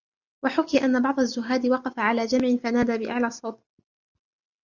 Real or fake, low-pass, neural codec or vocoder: real; 7.2 kHz; none